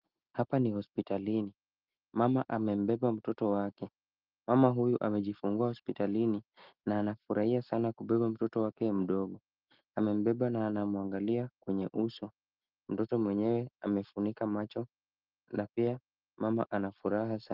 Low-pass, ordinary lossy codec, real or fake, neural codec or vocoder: 5.4 kHz; Opus, 32 kbps; real; none